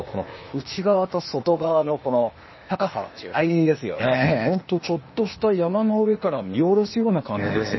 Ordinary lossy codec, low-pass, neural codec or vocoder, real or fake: MP3, 24 kbps; 7.2 kHz; codec, 16 kHz, 0.8 kbps, ZipCodec; fake